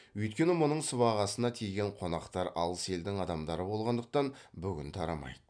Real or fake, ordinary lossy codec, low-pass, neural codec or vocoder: real; none; 9.9 kHz; none